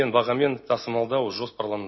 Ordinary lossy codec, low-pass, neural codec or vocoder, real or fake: MP3, 24 kbps; 7.2 kHz; none; real